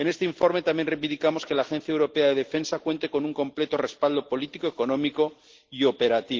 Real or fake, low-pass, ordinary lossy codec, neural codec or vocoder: real; 7.2 kHz; Opus, 16 kbps; none